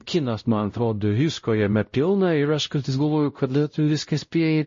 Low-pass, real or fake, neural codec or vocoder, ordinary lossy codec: 7.2 kHz; fake; codec, 16 kHz, 0.5 kbps, X-Codec, WavLM features, trained on Multilingual LibriSpeech; MP3, 32 kbps